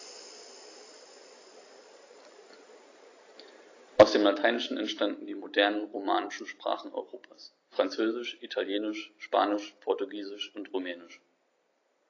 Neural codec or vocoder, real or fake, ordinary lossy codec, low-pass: none; real; AAC, 32 kbps; 7.2 kHz